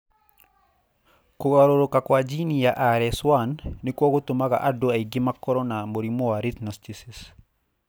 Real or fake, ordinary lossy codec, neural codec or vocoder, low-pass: real; none; none; none